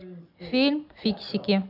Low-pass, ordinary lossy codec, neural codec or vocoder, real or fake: 5.4 kHz; none; none; real